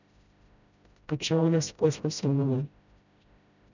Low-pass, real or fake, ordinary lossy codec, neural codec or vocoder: 7.2 kHz; fake; none; codec, 16 kHz, 0.5 kbps, FreqCodec, smaller model